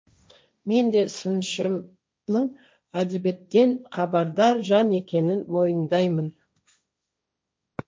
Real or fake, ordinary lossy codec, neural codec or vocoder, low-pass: fake; none; codec, 16 kHz, 1.1 kbps, Voila-Tokenizer; none